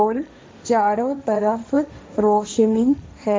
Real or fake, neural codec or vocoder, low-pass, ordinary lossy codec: fake; codec, 16 kHz, 1.1 kbps, Voila-Tokenizer; none; none